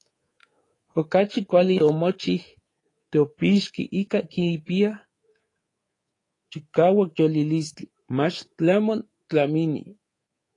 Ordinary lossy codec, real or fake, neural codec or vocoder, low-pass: AAC, 32 kbps; fake; codec, 24 kHz, 3.1 kbps, DualCodec; 10.8 kHz